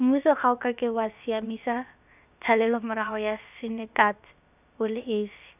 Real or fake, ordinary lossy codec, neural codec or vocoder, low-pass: fake; none; codec, 16 kHz, about 1 kbps, DyCAST, with the encoder's durations; 3.6 kHz